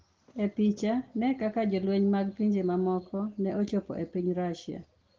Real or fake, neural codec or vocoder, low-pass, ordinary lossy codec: real; none; 7.2 kHz; Opus, 16 kbps